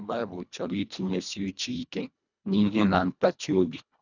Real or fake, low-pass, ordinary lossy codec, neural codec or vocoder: fake; 7.2 kHz; none; codec, 24 kHz, 1.5 kbps, HILCodec